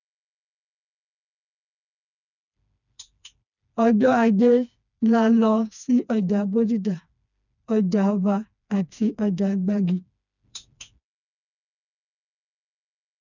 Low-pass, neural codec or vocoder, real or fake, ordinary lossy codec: 7.2 kHz; codec, 16 kHz, 2 kbps, FreqCodec, smaller model; fake; none